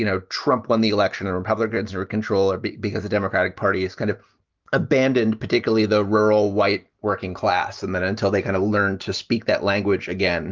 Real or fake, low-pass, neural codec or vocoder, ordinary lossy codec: real; 7.2 kHz; none; Opus, 32 kbps